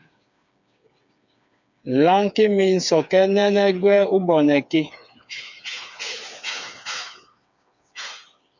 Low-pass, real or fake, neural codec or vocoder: 7.2 kHz; fake; codec, 16 kHz, 4 kbps, FreqCodec, smaller model